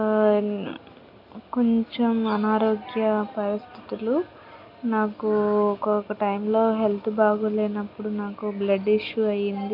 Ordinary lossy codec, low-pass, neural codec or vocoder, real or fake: none; 5.4 kHz; none; real